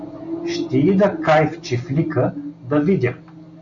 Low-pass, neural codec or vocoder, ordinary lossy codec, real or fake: 7.2 kHz; none; AAC, 64 kbps; real